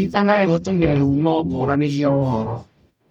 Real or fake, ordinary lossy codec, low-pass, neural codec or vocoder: fake; none; 19.8 kHz; codec, 44.1 kHz, 0.9 kbps, DAC